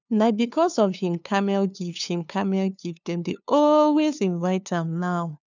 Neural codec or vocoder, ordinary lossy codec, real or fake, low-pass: codec, 16 kHz, 2 kbps, FunCodec, trained on LibriTTS, 25 frames a second; none; fake; 7.2 kHz